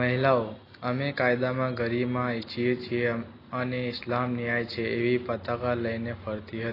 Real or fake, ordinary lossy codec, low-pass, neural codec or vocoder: real; AAC, 24 kbps; 5.4 kHz; none